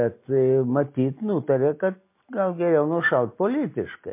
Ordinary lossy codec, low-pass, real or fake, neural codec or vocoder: MP3, 24 kbps; 3.6 kHz; real; none